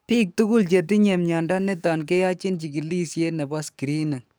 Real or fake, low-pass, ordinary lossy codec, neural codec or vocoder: fake; none; none; codec, 44.1 kHz, 7.8 kbps, DAC